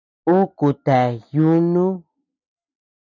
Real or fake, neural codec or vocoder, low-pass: real; none; 7.2 kHz